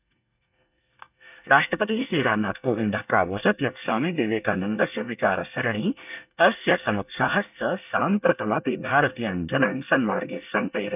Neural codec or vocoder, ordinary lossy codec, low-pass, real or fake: codec, 24 kHz, 1 kbps, SNAC; none; 3.6 kHz; fake